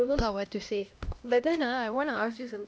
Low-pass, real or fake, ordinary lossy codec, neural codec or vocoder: none; fake; none; codec, 16 kHz, 1 kbps, X-Codec, HuBERT features, trained on LibriSpeech